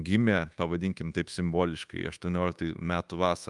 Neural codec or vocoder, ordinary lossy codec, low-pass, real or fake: codec, 24 kHz, 1.2 kbps, DualCodec; Opus, 32 kbps; 10.8 kHz; fake